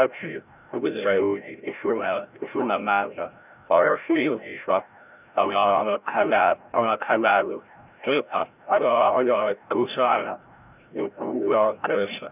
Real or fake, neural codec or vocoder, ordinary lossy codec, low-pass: fake; codec, 16 kHz, 0.5 kbps, FreqCodec, larger model; none; 3.6 kHz